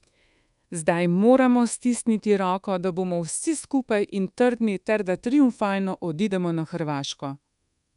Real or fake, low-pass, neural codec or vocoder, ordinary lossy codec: fake; 10.8 kHz; codec, 24 kHz, 1.2 kbps, DualCodec; none